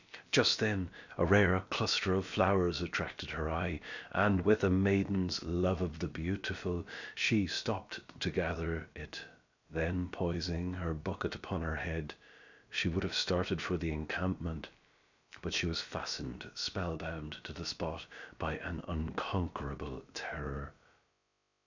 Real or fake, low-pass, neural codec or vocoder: fake; 7.2 kHz; codec, 16 kHz, about 1 kbps, DyCAST, with the encoder's durations